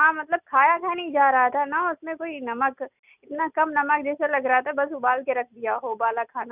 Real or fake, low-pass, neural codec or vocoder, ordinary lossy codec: real; 3.6 kHz; none; none